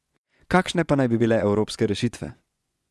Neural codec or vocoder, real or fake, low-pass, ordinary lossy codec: vocoder, 24 kHz, 100 mel bands, Vocos; fake; none; none